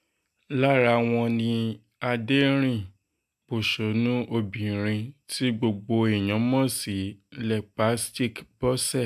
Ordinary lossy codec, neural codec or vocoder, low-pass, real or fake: AAC, 96 kbps; none; 14.4 kHz; real